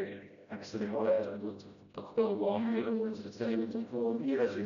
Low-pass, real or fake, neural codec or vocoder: 7.2 kHz; fake; codec, 16 kHz, 0.5 kbps, FreqCodec, smaller model